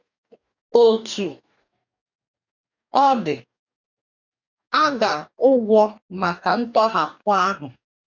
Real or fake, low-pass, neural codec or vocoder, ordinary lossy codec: fake; 7.2 kHz; codec, 44.1 kHz, 2.6 kbps, DAC; none